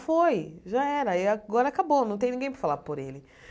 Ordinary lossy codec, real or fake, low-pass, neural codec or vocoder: none; real; none; none